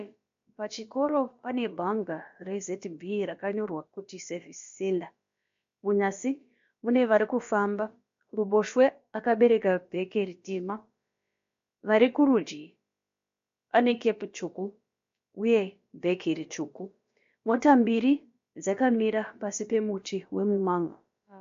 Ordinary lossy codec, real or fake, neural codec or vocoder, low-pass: MP3, 48 kbps; fake; codec, 16 kHz, about 1 kbps, DyCAST, with the encoder's durations; 7.2 kHz